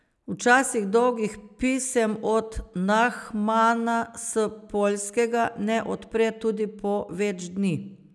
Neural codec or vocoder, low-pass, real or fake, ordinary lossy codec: none; none; real; none